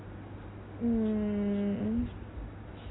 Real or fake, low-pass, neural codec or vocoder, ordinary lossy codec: real; 7.2 kHz; none; AAC, 16 kbps